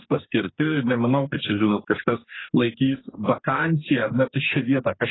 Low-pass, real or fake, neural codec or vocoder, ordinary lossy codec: 7.2 kHz; fake; codec, 44.1 kHz, 2.6 kbps, DAC; AAC, 16 kbps